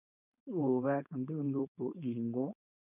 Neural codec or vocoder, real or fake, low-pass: codec, 16 kHz, 4.8 kbps, FACodec; fake; 3.6 kHz